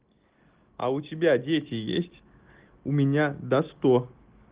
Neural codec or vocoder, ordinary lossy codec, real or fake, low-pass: none; Opus, 16 kbps; real; 3.6 kHz